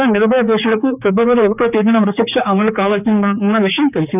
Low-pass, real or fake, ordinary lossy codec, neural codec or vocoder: 3.6 kHz; fake; none; codec, 16 kHz, 4 kbps, X-Codec, HuBERT features, trained on general audio